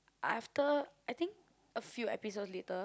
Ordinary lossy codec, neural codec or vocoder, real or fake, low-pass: none; none; real; none